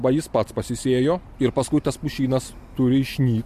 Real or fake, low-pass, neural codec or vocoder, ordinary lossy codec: real; 14.4 kHz; none; MP3, 64 kbps